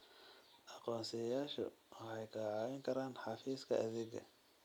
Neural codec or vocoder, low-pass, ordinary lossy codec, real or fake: none; none; none; real